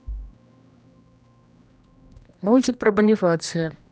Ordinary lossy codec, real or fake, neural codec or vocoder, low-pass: none; fake; codec, 16 kHz, 1 kbps, X-Codec, HuBERT features, trained on general audio; none